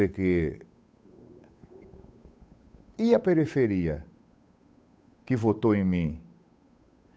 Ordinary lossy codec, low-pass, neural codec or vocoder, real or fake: none; none; codec, 16 kHz, 8 kbps, FunCodec, trained on Chinese and English, 25 frames a second; fake